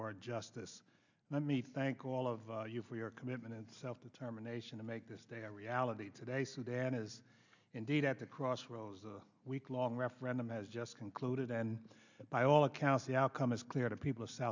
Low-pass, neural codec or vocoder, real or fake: 7.2 kHz; none; real